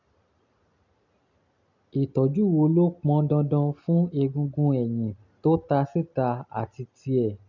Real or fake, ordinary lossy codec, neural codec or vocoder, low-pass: real; none; none; 7.2 kHz